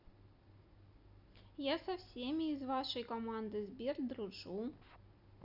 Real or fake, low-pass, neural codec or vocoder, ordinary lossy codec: real; 5.4 kHz; none; none